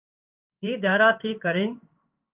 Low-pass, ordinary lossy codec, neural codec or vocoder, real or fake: 3.6 kHz; Opus, 32 kbps; codec, 16 kHz in and 24 kHz out, 1 kbps, XY-Tokenizer; fake